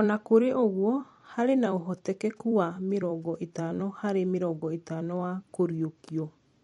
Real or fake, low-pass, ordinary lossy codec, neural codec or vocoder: fake; 19.8 kHz; MP3, 48 kbps; vocoder, 44.1 kHz, 128 mel bands every 256 samples, BigVGAN v2